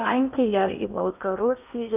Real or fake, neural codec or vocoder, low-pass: fake; codec, 16 kHz in and 24 kHz out, 0.8 kbps, FocalCodec, streaming, 65536 codes; 3.6 kHz